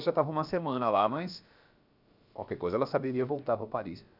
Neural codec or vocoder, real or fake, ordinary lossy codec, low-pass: codec, 16 kHz, about 1 kbps, DyCAST, with the encoder's durations; fake; none; 5.4 kHz